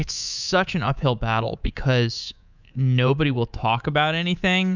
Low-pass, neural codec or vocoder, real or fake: 7.2 kHz; codec, 24 kHz, 3.1 kbps, DualCodec; fake